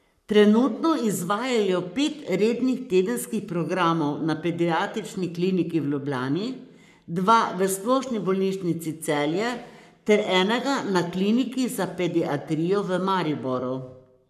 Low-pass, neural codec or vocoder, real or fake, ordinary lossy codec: 14.4 kHz; codec, 44.1 kHz, 7.8 kbps, Pupu-Codec; fake; none